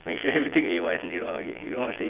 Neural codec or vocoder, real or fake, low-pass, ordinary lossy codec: vocoder, 22.05 kHz, 80 mel bands, Vocos; fake; 3.6 kHz; Opus, 24 kbps